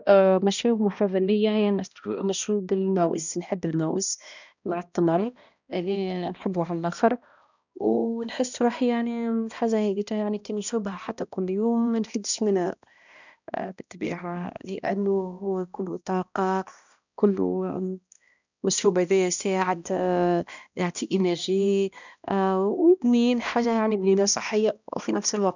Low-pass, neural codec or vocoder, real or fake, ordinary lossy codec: 7.2 kHz; codec, 16 kHz, 1 kbps, X-Codec, HuBERT features, trained on balanced general audio; fake; none